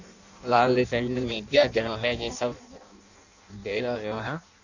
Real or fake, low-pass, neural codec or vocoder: fake; 7.2 kHz; codec, 16 kHz in and 24 kHz out, 0.6 kbps, FireRedTTS-2 codec